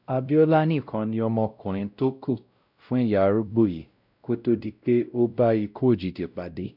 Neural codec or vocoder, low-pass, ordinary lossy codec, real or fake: codec, 16 kHz, 0.5 kbps, X-Codec, WavLM features, trained on Multilingual LibriSpeech; 5.4 kHz; none; fake